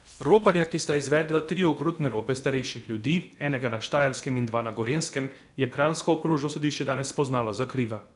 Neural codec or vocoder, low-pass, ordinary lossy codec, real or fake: codec, 16 kHz in and 24 kHz out, 0.8 kbps, FocalCodec, streaming, 65536 codes; 10.8 kHz; none; fake